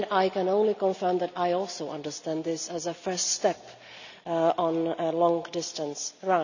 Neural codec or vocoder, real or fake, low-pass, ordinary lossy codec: none; real; 7.2 kHz; none